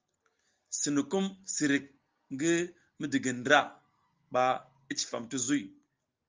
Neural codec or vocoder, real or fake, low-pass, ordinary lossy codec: none; real; 7.2 kHz; Opus, 32 kbps